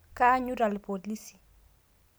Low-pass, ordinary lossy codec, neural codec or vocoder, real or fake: none; none; none; real